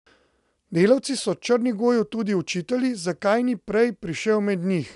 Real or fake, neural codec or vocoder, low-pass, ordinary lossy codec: real; none; 10.8 kHz; none